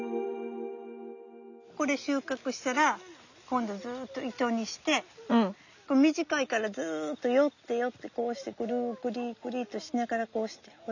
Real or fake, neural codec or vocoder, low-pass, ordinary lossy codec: real; none; 7.2 kHz; none